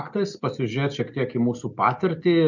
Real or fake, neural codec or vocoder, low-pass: real; none; 7.2 kHz